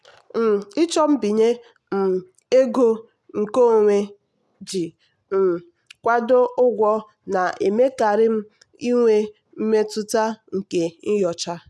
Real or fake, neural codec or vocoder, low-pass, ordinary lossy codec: real; none; none; none